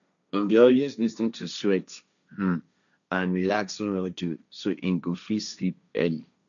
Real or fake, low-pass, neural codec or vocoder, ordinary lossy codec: fake; 7.2 kHz; codec, 16 kHz, 1.1 kbps, Voila-Tokenizer; none